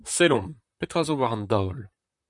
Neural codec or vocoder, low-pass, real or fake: vocoder, 44.1 kHz, 128 mel bands, Pupu-Vocoder; 10.8 kHz; fake